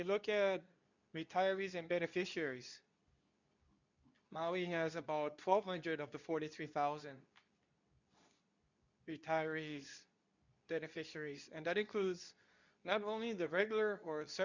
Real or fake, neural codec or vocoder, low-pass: fake; codec, 24 kHz, 0.9 kbps, WavTokenizer, medium speech release version 2; 7.2 kHz